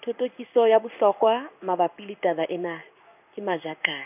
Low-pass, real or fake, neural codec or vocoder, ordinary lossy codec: 3.6 kHz; fake; codec, 16 kHz in and 24 kHz out, 1 kbps, XY-Tokenizer; none